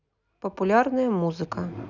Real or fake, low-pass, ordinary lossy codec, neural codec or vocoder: real; 7.2 kHz; none; none